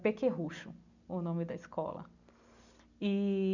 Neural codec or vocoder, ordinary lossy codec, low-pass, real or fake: none; none; 7.2 kHz; real